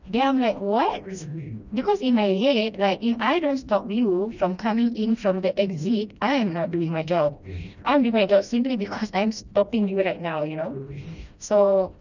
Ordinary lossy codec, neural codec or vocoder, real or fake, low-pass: none; codec, 16 kHz, 1 kbps, FreqCodec, smaller model; fake; 7.2 kHz